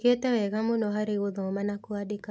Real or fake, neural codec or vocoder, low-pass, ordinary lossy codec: real; none; none; none